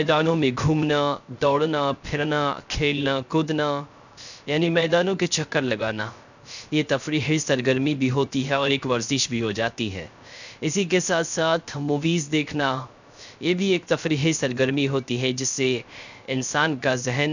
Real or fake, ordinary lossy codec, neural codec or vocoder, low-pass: fake; none; codec, 16 kHz, 0.3 kbps, FocalCodec; 7.2 kHz